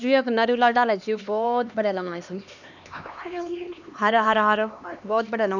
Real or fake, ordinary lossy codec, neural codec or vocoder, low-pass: fake; none; codec, 16 kHz, 2 kbps, X-Codec, HuBERT features, trained on LibriSpeech; 7.2 kHz